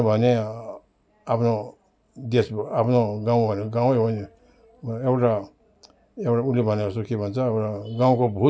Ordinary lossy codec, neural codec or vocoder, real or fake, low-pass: none; none; real; none